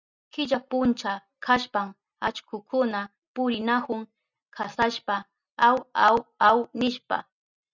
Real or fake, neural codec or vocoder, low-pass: real; none; 7.2 kHz